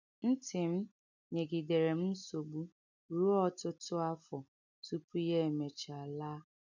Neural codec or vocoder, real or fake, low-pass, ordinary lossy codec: none; real; 7.2 kHz; none